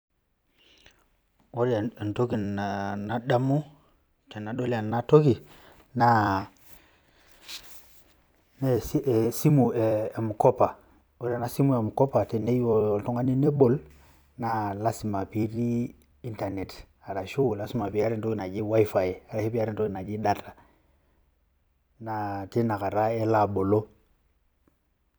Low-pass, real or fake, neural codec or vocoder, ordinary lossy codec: none; fake; vocoder, 44.1 kHz, 128 mel bands every 256 samples, BigVGAN v2; none